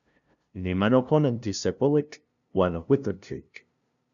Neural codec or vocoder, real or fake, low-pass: codec, 16 kHz, 0.5 kbps, FunCodec, trained on LibriTTS, 25 frames a second; fake; 7.2 kHz